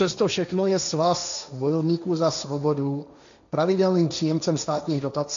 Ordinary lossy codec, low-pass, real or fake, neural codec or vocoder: MP3, 64 kbps; 7.2 kHz; fake; codec, 16 kHz, 1.1 kbps, Voila-Tokenizer